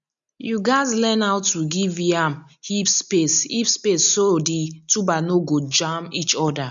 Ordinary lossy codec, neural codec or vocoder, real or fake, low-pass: none; none; real; 7.2 kHz